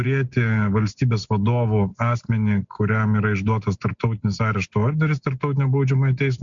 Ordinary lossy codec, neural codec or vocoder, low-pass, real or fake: MP3, 64 kbps; none; 7.2 kHz; real